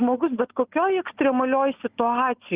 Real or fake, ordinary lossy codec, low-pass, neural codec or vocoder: real; Opus, 32 kbps; 3.6 kHz; none